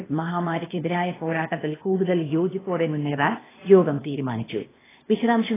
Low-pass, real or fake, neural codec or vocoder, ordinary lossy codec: 3.6 kHz; fake; codec, 16 kHz, 0.8 kbps, ZipCodec; AAC, 16 kbps